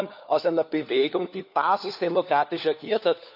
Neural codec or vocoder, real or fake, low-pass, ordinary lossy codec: codec, 16 kHz, 4 kbps, FunCodec, trained on LibriTTS, 50 frames a second; fake; 5.4 kHz; none